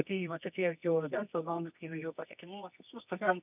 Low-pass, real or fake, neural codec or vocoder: 3.6 kHz; fake; codec, 24 kHz, 0.9 kbps, WavTokenizer, medium music audio release